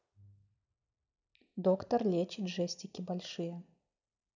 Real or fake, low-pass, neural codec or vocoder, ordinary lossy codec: real; 7.2 kHz; none; none